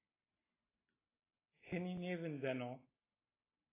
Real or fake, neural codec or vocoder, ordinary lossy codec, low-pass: real; none; AAC, 16 kbps; 3.6 kHz